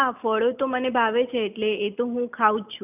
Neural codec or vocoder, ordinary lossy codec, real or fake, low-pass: none; none; real; 3.6 kHz